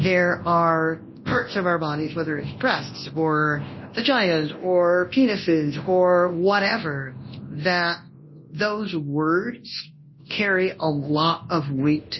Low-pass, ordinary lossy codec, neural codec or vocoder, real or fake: 7.2 kHz; MP3, 24 kbps; codec, 24 kHz, 0.9 kbps, WavTokenizer, large speech release; fake